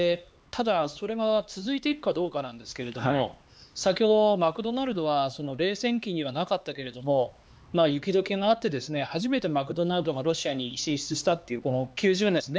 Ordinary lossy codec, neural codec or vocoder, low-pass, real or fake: none; codec, 16 kHz, 2 kbps, X-Codec, HuBERT features, trained on LibriSpeech; none; fake